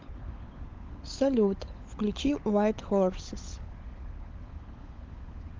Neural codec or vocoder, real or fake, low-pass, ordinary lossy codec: codec, 16 kHz, 16 kbps, FunCodec, trained on LibriTTS, 50 frames a second; fake; 7.2 kHz; Opus, 24 kbps